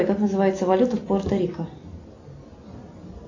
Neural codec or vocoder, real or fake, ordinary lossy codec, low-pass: none; real; AAC, 48 kbps; 7.2 kHz